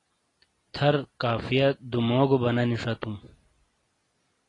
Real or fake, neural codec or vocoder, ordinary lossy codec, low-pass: real; none; AAC, 32 kbps; 10.8 kHz